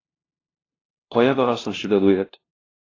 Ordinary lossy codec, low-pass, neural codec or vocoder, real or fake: AAC, 32 kbps; 7.2 kHz; codec, 16 kHz, 0.5 kbps, FunCodec, trained on LibriTTS, 25 frames a second; fake